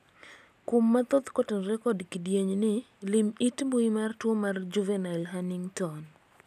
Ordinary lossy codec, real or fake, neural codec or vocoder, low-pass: none; real; none; 14.4 kHz